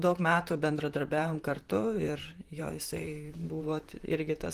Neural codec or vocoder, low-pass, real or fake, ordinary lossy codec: vocoder, 44.1 kHz, 128 mel bands, Pupu-Vocoder; 14.4 kHz; fake; Opus, 24 kbps